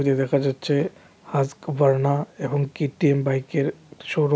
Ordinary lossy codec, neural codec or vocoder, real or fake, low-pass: none; none; real; none